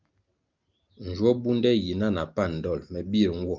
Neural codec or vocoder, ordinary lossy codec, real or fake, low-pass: none; Opus, 24 kbps; real; 7.2 kHz